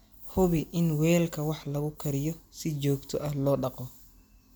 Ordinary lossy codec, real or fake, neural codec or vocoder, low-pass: none; real; none; none